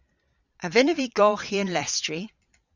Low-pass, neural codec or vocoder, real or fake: 7.2 kHz; vocoder, 22.05 kHz, 80 mel bands, Vocos; fake